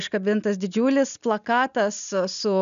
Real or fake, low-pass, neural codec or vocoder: real; 7.2 kHz; none